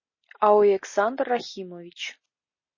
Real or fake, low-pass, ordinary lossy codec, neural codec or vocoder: real; 7.2 kHz; MP3, 32 kbps; none